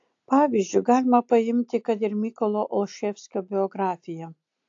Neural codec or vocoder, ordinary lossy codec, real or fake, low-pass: none; AAC, 48 kbps; real; 7.2 kHz